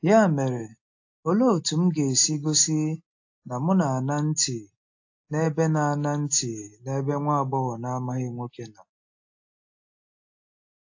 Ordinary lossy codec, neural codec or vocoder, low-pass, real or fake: AAC, 48 kbps; none; 7.2 kHz; real